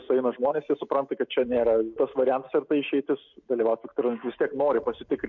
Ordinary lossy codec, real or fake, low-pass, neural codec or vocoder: MP3, 48 kbps; real; 7.2 kHz; none